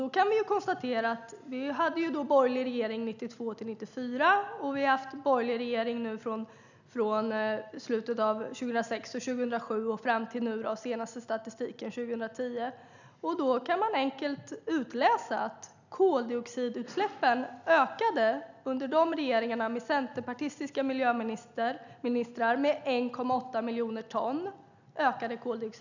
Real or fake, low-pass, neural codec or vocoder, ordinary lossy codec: real; 7.2 kHz; none; none